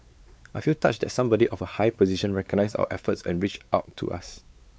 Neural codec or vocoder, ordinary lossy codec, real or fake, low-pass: codec, 16 kHz, 4 kbps, X-Codec, WavLM features, trained on Multilingual LibriSpeech; none; fake; none